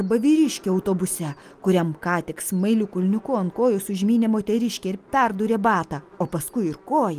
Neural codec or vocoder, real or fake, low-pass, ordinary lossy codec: none; real; 14.4 kHz; Opus, 32 kbps